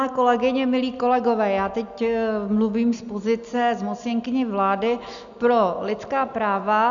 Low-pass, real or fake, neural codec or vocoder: 7.2 kHz; real; none